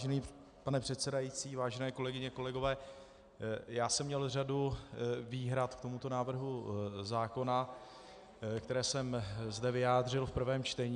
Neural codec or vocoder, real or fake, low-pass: none; real; 9.9 kHz